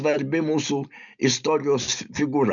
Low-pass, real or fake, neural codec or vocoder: 7.2 kHz; real; none